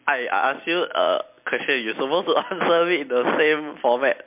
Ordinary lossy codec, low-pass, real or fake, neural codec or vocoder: MP3, 32 kbps; 3.6 kHz; real; none